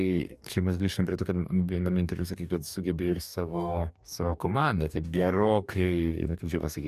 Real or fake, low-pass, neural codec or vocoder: fake; 14.4 kHz; codec, 44.1 kHz, 2.6 kbps, DAC